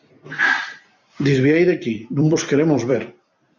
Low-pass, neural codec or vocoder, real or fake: 7.2 kHz; vocoder, 44.1 kHz, 128 mel bands every 256 samples, BigVGAN v2; fake